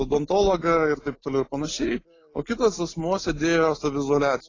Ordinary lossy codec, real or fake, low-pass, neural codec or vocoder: AAC, 32 kbps; real; 7.2 kHz; none